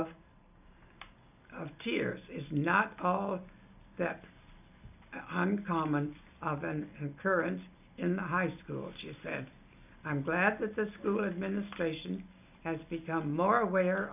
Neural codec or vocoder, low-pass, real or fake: none; 3.6 kHz; real